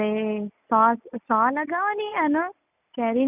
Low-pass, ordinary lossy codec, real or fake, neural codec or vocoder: 3.6 kHz; none; real; none